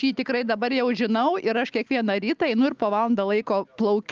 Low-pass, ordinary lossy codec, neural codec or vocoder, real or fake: 7.2 kHz; Opus, 24 kbps; none; real